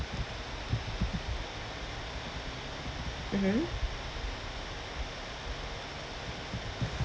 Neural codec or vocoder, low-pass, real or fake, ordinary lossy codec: none; none; real; none